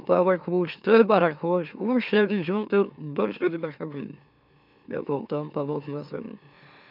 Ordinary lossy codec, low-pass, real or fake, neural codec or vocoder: none; 5.4 kHz; fake; autoencoder, 44.1 kHz, a latent of 192 numbers a frame, MeloTTS